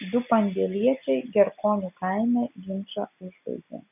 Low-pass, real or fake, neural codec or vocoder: 3.6 kHz; real; none